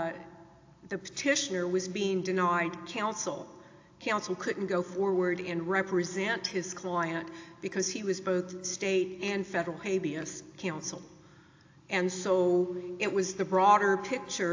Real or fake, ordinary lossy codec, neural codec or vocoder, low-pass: real; AAC, 48 kbps; none; 7.2 kHz